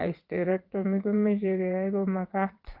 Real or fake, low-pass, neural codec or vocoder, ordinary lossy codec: fake; 5.4 kHz; vocoder, 22.05 kHz, 80 mel bands, WaveNeXt; Opus, 32 kbps